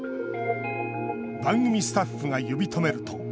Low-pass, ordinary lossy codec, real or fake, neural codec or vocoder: none; none; real; none